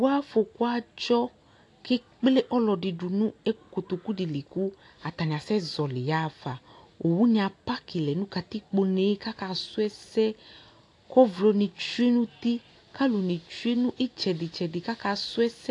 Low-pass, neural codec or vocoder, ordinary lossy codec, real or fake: 10.8 kHz; none; AAC, 48 kbps; real